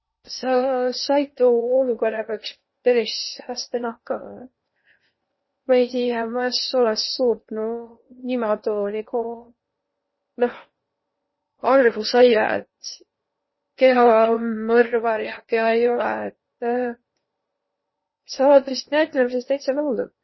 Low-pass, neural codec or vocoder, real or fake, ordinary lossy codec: 7.2 kHz; codec, 16 kHz in and 24 kHz out, 0.8 kbps, FocalCodec, streaming, 65536 codes; fake; MP3, 24 kbps